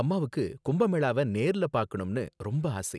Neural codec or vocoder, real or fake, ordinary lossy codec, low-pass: none; real; none; none